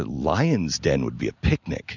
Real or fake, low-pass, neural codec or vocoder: real; 7.2 kHz; none